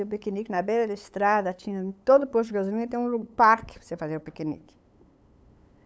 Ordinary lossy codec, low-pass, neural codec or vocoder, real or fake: none; none; codec, 16 kHz, 8 kbps, FunCodec, trained on LibriTTS, 25 frames a second; fake